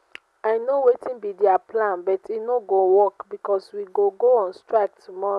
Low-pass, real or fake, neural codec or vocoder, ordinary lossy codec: none; real; none; none